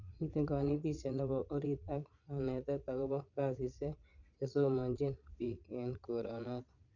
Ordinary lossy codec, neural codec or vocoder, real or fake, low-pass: none; vocoder, 22.05 kHz, 80 mel bands, Vocos; fake; 7.2 kHz